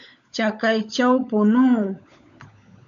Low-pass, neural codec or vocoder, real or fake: 7.2 kHz; codec, 16 kHz, 16 kbps, FunCodec, trained on LibriTTS, 50 frames a second; fake